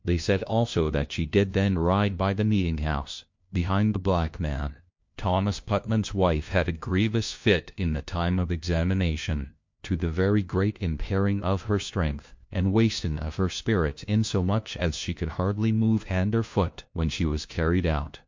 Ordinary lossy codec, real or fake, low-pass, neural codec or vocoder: MP3, 48 kbps; fake; 7.2 kHz; codec, 16 kHz, 1 kbps, FunCodec, trained on LibriTTS, 50 frames a second